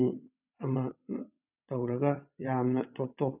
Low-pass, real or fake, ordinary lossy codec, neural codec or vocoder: 3.6 kHz; fake; none; vocoder, 22.05 kHz, 80 mel bands, WaveNeXt